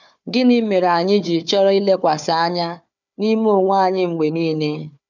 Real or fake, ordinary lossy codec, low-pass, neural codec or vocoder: fake; none; 7.2 kHz; codec, 16 kHz, 4 kbps, FunCodec, trained on Chinese and English, 50 frames a second